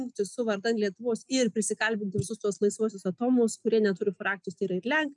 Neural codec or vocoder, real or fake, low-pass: none; real; 10.8 kHz